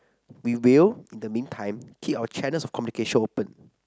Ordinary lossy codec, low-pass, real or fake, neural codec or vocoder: none; none; real; none